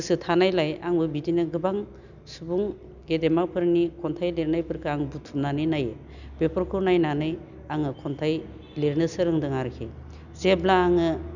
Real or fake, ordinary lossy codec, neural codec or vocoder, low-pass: real; none; none; 7.2 kHz